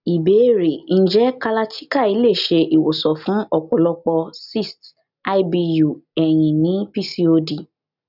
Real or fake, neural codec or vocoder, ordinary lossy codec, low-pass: real; none; none; 5.4 kHz